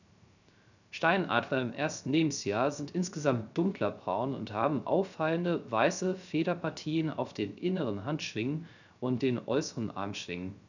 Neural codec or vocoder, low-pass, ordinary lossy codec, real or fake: codec, 16 kHz, 0.3 kbps, FocalCodec; 7.2 kHz; none; fake